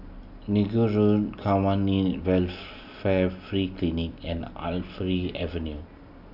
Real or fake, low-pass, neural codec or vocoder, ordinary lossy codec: real; 5.4 kHz; none; none